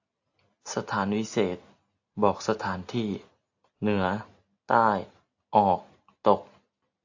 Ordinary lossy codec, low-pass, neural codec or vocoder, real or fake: AAC, 48 kbps; 7.2 kHz; none; real